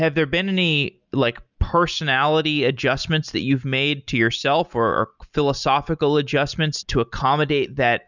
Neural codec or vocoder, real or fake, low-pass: none; real; 7.2 kHz